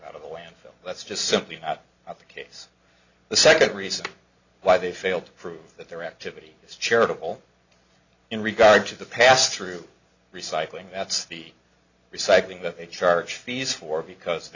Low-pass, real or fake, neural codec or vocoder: 7.2 kHz; real; none